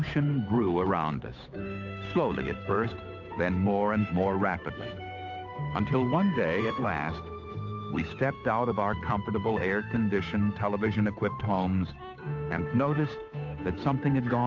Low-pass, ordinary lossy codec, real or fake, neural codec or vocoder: 7.2 kHz; AAC, 48 kbps; fake; codec, 16 kHz, 8 kbps, FunCodec, trained on Chinese and English, 25 frames a second